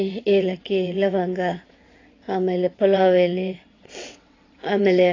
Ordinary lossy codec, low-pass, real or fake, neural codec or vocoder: AAC, 32 kbps; 7.2 kHz; fake; vocoder, 22.05 kHz, 80 mel bands, WaveNeXt